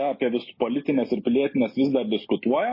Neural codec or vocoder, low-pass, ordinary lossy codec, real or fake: none; 5.4 kHz; MP3, 24 kbps; real